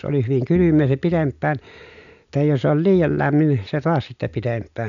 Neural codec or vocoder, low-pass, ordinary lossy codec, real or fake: none; 7.2 kHz; none; real